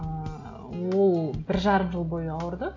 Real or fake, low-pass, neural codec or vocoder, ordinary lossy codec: real; 7.2 kHz; none; AAC, 48 kbps